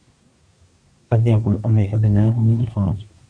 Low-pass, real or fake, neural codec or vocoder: 9.9 kHz; fake; codec, 24 kHz, 1 kbps, SNAC